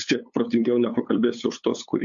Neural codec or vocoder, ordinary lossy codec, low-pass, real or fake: codec, 16 kHz, 8 kbps, FunCodec, trained on LibriTTS, 25 frames a second; MP3, 64 kbps; 7.2 kHz; fake